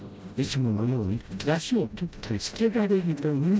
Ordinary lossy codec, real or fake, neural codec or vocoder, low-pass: none; fake; codec, 16 kHz, 0.5 kbps, FreqCodec, smaller model; none